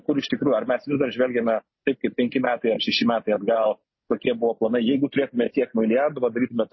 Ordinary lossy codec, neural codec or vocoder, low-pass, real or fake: MP3, 24 kbps; none; 7.2 kHz; real